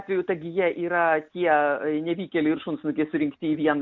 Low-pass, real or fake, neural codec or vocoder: 7.2 kHz; real; none